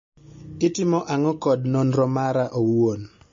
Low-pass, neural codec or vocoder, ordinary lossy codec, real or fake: 7.2 kHz; none; MP3, 32 kbps; real